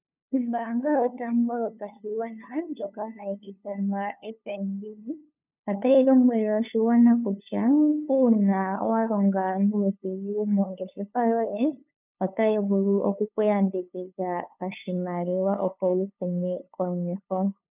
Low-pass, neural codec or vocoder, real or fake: 3.6 kHz; codec, 16 kHz, 2 kbps, FunCodec, trained on LibriTTS, 25 frames a second; fake